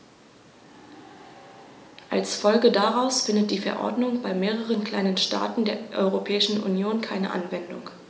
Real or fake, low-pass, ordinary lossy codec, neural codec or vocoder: real; none; none; none